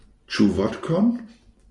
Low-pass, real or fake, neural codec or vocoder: 10.8 kHz; real; none